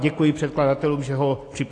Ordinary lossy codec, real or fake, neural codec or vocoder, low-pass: AAC, 48 kbps; real; none; 10.8 kHz